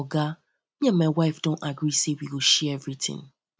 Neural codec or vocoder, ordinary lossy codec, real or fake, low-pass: none; none; real; none